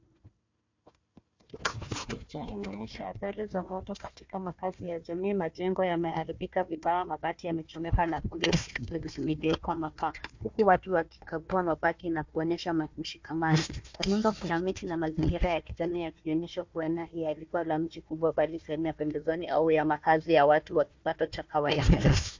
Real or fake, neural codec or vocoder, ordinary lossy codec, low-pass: fake; codec, 16 kHz, 1 kbps, FunCodec, trained on Chinese and English, 50 frames a second; MP3, 48 kbps; 7.2 kHz